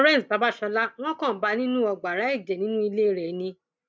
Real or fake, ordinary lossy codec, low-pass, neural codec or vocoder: real; none; none; none